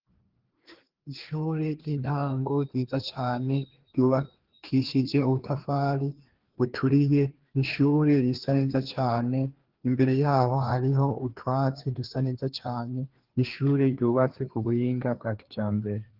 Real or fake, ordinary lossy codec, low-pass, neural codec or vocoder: fake; Opus, 16 kbps; 5.4 kHz; codec, 16 kHz, 2 kbps, FreqCodec, larger model